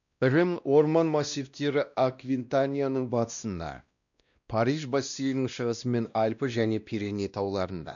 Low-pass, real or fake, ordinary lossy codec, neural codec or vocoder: 7.2 kHz; fake; none; codec, 16 kHz, 1 kbps, X-Codec, WavLM features, trained on Multilingual LibriSpeech